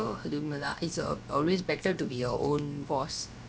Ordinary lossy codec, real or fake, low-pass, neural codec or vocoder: none; fake; none; codec, 16 kHz, about 1 kbps, DyCAST, with the encoder's durations